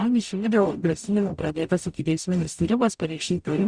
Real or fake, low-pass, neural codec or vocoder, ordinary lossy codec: fake; 9.9 kHz; codec, 44.1 kHz, 0.9 kbps, DAC; Opus, 64 kbps